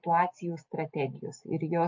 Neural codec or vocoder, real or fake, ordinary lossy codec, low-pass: none; real; MP3, 48 kbps; 7.2 kHz